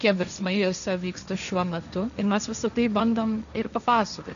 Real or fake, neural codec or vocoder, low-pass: fake; codec, 16 kHz, 1.1 kbps, Voila-Tokenizer; 7.2 kHz